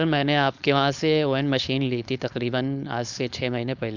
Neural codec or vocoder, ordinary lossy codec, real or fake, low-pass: codec, 16 kHz, 4.8 kbps, FACodec; none; fake; 7.2 kHz